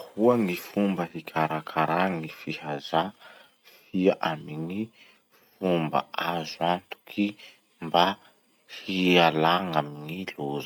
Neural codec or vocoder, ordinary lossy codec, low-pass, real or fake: none; none; none; real